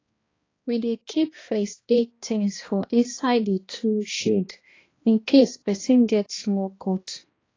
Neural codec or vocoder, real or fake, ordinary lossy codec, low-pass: codec, 16 kHz, 1 kbps, X-Codec, HuBERT features, trained on balanced general audio; fake; AAC, 32 kbps; 7.2 kHz